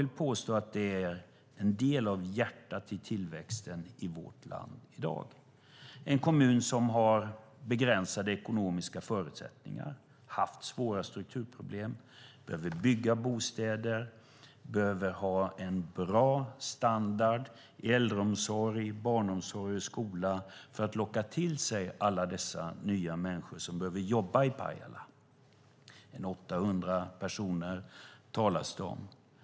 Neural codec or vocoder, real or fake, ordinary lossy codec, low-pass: none; real; none; none